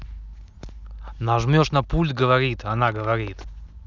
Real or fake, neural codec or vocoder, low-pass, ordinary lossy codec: real; none; 7.2 kHz; none